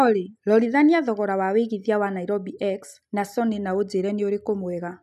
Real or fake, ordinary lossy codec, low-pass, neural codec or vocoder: real; none; 14.4 kHz; none